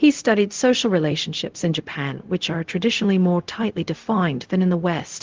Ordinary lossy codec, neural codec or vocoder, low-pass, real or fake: Opus, 16 kbps; codec, 16 kHz, 0.4 kbps, LongCat-Audio-Codec; 7.2 kHz; fake